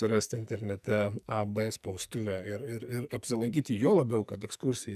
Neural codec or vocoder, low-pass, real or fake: codec, 44.1 kHz, 2.6 kbps, SNAC; 14.4 kHz; fake